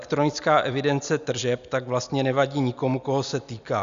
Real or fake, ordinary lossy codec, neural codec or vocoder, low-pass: real; Opus, 64 kbps; none; 7.2 kHz